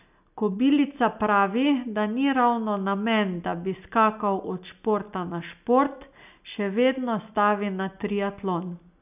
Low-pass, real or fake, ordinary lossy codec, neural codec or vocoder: 3.6 kHz; real; none; none